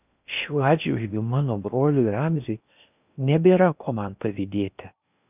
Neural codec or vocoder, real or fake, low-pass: codec, 16 kHz in and 24 kHz out, 0.8 kbps, FocalCodec, streaming, 65536 codes; fake; 3.6 kHz